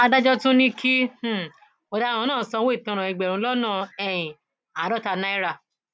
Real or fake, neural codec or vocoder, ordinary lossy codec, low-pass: real; none; none; none